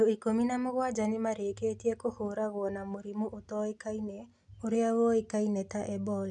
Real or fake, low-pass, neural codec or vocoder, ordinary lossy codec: real; 10.8 kHz; none; none